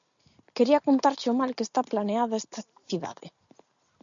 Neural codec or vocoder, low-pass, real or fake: none; 7.2 kHz; real